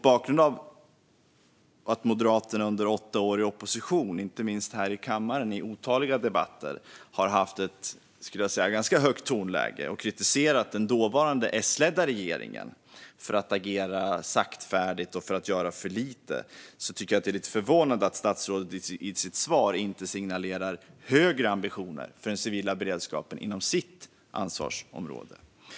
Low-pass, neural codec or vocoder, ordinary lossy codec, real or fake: none; none; none; real